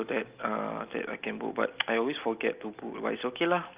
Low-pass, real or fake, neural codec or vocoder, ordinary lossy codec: 3.6 kHz; real; none; Opus, 24 kbps